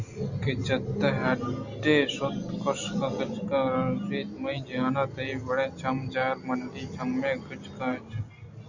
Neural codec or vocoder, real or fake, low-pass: none; real; 7.2 kHz